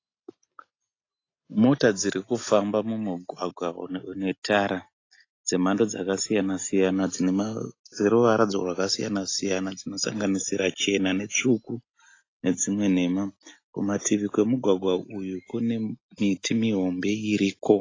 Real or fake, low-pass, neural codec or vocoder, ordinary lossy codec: real; 7.2 kHz; none; AAC, 32 kbps